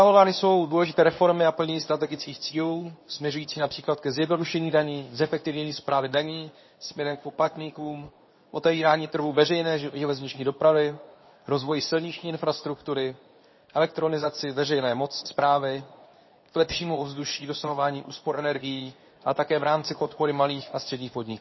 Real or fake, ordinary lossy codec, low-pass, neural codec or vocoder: fake; MP3, 24 kbps; 7.2 kHz; codec, 24 kHz, 0.9 kbps, WavTokenizer, medium speech release version 2